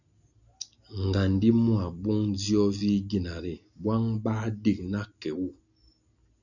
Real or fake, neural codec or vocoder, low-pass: real; none; 7.2 kHz